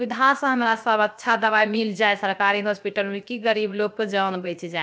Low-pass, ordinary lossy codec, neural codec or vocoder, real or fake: none; none; codec, 16 kHz, 0.7 kbps, FocalCodec; fake